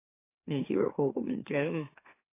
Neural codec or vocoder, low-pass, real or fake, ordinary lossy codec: autoencoder, 44.1 kHz, a latent of 192 numbers a frame, MeloTTS; 3.6 kHz; fake; AAC, 24 kbps